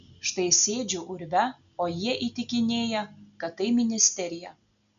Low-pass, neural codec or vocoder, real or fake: 7.2 kHz; none; real